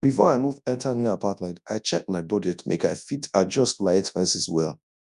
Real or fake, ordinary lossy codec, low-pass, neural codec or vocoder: fake; none; 10.8 kHz; codec, 24 kHz, 0.9 kbps, WavTokenizer, large speech release